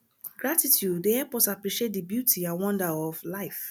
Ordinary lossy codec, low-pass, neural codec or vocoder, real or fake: none; none; none; real